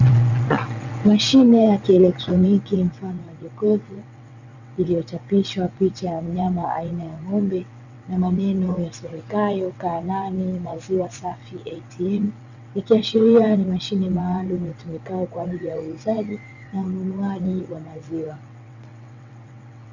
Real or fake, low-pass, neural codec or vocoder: fake; 7.2 kHz; vocoder, 22.05 kHz, 80 mel bands, WaveNeXt